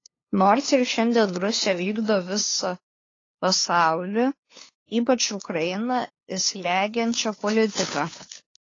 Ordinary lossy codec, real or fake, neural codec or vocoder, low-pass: AAC, 32 kbps; fake; codec, 16 kHz, 2 kbps, FunCodec, trained on LibriTTS, 25 frames a second; 7.2 kHz